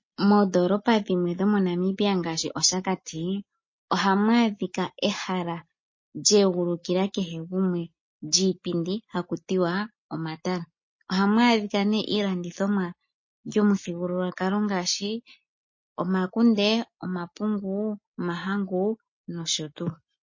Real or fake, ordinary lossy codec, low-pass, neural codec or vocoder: real; MP3, 32 kbps; 7.2 kHz; none